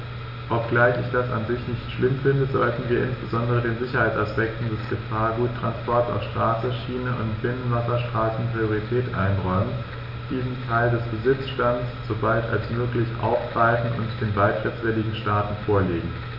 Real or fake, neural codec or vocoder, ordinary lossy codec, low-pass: real; none; none; 5.4 kHz